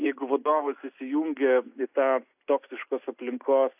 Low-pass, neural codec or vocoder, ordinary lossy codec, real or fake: 3.6 kHz; none; AAC, 32 kbps; real